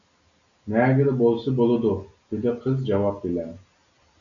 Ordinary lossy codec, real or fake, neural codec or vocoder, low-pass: MP3, 96 kbps; real; none; 7.2 kHz